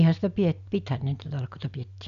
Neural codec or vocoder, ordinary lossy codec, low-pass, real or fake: none; none; 7.2 kHz; real